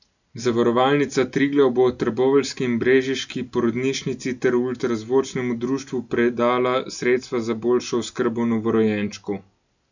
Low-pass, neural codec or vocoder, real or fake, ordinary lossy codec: 7.2 kHz; none; real; none